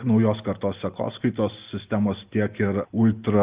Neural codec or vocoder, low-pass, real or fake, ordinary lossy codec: none; 3.6 kHz; real; Opus, 32 kbps